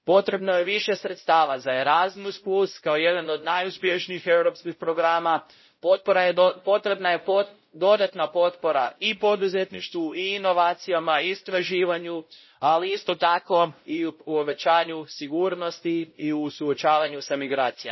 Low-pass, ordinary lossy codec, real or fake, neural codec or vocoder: 7.2 kHz; MP3, 24 kbps; fake; codec, 16 kHz, 0.5 kbps, X-Codec, WavLM features, trained on Multilingual LibriSpeech